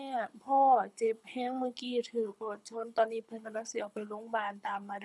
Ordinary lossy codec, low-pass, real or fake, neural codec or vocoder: none; none; fake; codec, 24 kHz, 6 kbps, HILCodec